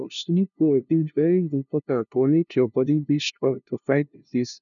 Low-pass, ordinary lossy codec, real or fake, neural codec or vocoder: 7.2 kHz; none; fake; codec, 16 kHz, 0.5 kbps, FunCodec, trained on LibriTTS, 25 frames a second